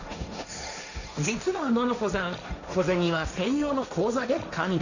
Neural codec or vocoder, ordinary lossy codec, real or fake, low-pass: codec, 16 kHz, 1.1 kbps, Voila-Tokenizer; none; fake; 7.2 kHz